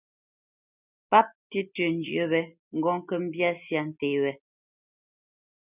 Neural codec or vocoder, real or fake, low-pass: none; real; 3.6 kHz